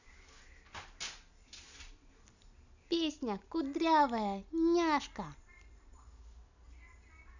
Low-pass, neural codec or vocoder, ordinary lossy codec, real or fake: 7.2 kHz; none; none; real